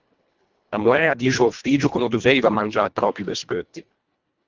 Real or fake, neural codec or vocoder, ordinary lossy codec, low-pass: fake; codec, 24 kHz, 1.5 kbps, HILCodec; Opus, 24 kbps; 7.2 kHz